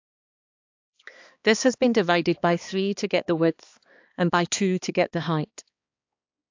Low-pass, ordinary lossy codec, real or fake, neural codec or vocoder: 7.2 kHz; AAC, 48 kbps; fake; codec, 16 kHz, 2 kbps, X-Codec, HuBERT features, trained on balanced general audio